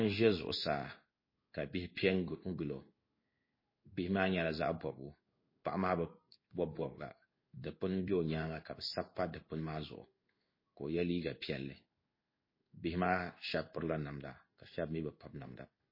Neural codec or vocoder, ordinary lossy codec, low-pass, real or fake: codec, 16 kHz in and 24 kHz out, 1 kbps, XY-Tokenizer; MP3, 24 kbps; 5.4 kHz; fake